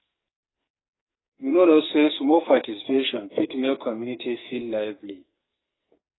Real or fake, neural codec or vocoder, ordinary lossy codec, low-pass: fake; codec, 44.1 kHz, 2.6 kbps, SNAC; AAC, 16 kbps; 7.2 kHz